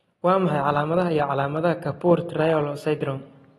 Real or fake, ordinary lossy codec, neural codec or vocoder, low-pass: real; AAC, 32 kbps; none; 19.8 kHz